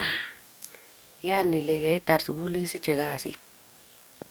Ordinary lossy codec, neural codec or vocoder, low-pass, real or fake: none; codec, 44.1 kHz, 2.6 kbps, DAC; none; fake